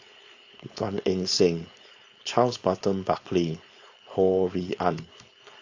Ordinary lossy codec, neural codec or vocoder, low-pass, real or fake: AAC, 48 kbps; codec, 16 kHz, 4.8 kbps, FACodec; 7.2 kHz; fake